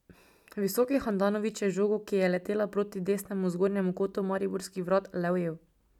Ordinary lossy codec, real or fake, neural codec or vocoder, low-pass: none; real; none; 19.8 kHz